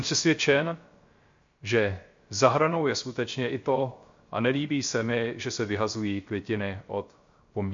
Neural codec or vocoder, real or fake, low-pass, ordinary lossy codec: codec, 16 kHz, 0.3 kbps, FocalCodec; fake; 7.2 kHz; MP3, 48 kbps